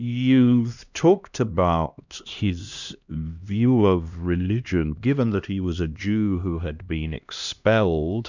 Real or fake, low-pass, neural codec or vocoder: fake; 7.2 kHz; codec, 16 kHz, 1 kbps, X-Codec, HuBERT features, trained on LibriSpeech